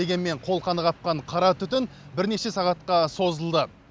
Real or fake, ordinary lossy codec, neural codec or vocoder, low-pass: real; none; none; none